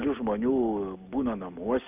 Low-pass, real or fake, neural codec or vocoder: 3.6 kHz; real; none